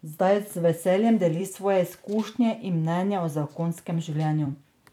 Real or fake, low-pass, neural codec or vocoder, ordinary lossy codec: fake; 19.8 kHz; vocoder, 44.1 kHz, 128 mel bands every 256 samples, BigVGAN v2; none